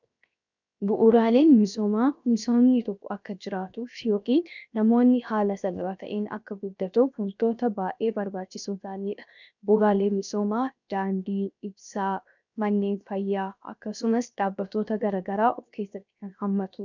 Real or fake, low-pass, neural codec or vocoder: fake; 7.2 kHz; codec, 16 kHz, 0.7 kbps, FocalCodec